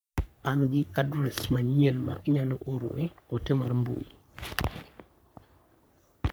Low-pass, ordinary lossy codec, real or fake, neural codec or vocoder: none; none; fake; codec, 44.1 kHz, 3.4 kbps, Pupu-Codec